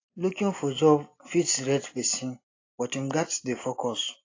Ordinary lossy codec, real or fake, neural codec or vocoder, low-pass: AAC, 32 kbps; real; none; 7.2 kHz